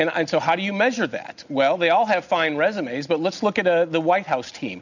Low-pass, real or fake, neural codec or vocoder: 7.2 kHz; real; none